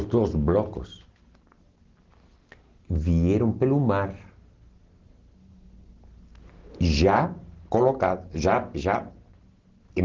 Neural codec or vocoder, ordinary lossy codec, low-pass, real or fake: none; Opus, 16 kbps; 7.2 kHz; real